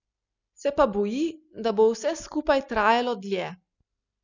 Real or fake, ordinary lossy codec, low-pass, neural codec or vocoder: real; none; 7.2 kHz; none